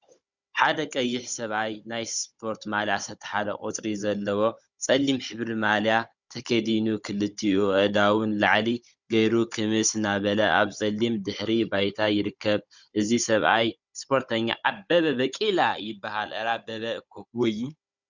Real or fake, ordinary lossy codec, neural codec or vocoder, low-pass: fake; Opus, 64 kbps; codec, 16 kHz, 16 kbps, FunCodec, trained on Chinese and English, 50 frames a second; 7.2 kHz